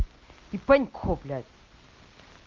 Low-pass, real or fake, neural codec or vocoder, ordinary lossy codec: 7.2 kHz; real; none; Opus, 24 kbps